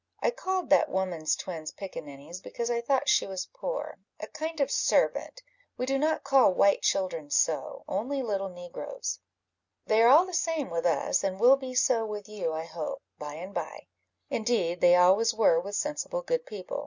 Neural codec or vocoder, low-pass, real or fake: none; 7.2 kHz; real